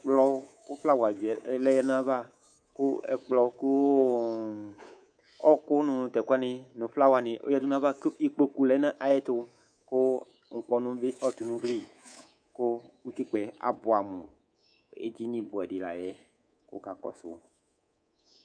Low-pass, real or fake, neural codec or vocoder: 9.9 kHz; fake; codec, 24 kHz, 3.1 kbps, DualCodec